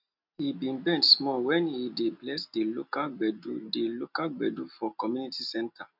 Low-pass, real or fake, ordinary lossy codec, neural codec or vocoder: 5.4 kHz; real; none; none